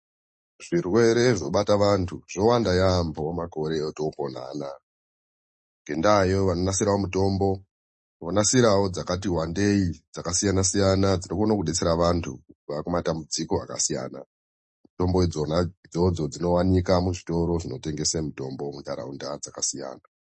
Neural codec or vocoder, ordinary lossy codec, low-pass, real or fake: none; MP3, 32 kbps; 10.8 kHz; real